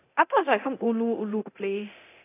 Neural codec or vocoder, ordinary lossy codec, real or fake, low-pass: codec, 24 kHz, 0.9 kbps, DualCodec; AAC, 24 kbps; fake; 3.6 kHz